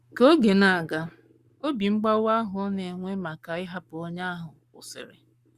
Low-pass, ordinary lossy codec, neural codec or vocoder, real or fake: 14.4 kHz; Opus, 64 kbps; codec, 44.1 kHz, 7.8 kbps, Pupu-Codec; fake